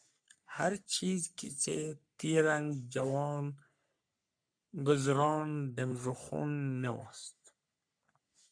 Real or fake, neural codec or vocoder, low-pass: fake; codec, 44.1 kHz, 3.4 kbps, Pupu-Codec; 9.9 kHz